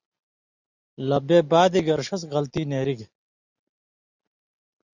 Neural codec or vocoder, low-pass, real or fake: none; 7.2 kHz; real